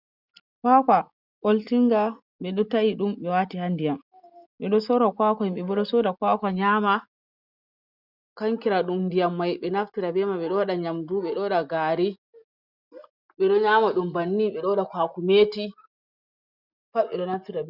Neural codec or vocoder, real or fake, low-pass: none; real; 5.4 kHz